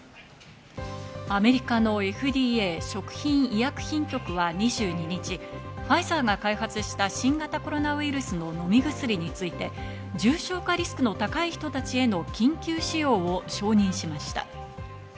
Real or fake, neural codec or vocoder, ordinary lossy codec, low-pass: real; none; none; none